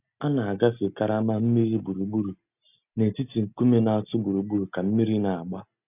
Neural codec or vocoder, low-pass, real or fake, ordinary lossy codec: none; 3.6 kHz; real; none